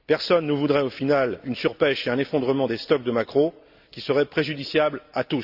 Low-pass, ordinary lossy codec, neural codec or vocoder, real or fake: 5.4 kHz; Opus, 64 kbps; none; real